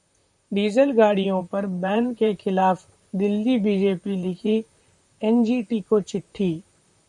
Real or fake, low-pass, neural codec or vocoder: fake; 10.8 kHz; vocoder, 44.1 kHz, 128 mel bands, Pupu-Vocoder